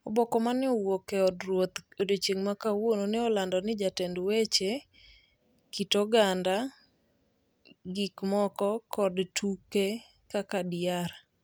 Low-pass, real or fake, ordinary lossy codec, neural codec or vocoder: none; real; none; none